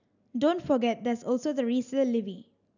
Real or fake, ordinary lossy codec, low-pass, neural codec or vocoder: real; none; 7.2 kHz; none